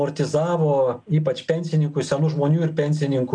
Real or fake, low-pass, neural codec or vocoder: real; 9.9 kHz; none